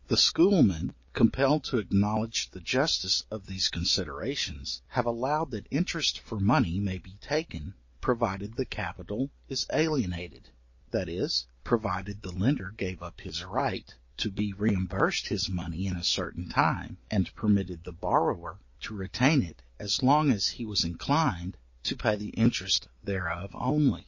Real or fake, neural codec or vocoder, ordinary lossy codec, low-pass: real; none; MP3, 32 kbps; 7.2 kHz